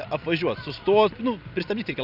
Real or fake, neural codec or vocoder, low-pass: real; none; 5.4 kHz